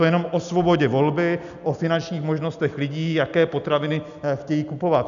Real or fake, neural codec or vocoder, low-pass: real; none; 7.2 kHz